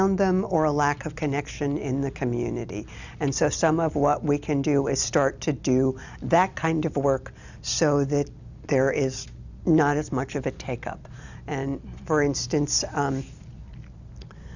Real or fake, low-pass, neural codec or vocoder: real; 7.2 kHz; none